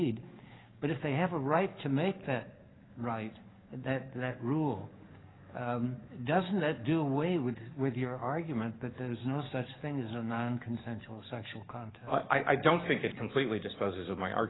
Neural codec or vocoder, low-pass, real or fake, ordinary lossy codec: codec, 44.1 kHz, 7.8 kbps, DAC; 7.2 kHz; fake; AAC, 16 kbps